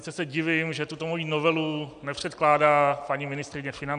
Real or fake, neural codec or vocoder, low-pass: real; none; 9.9 kHz